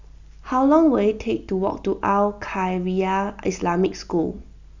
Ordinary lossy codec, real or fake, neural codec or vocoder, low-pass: Opus, 64 kbps; real; none; 7.2 kHz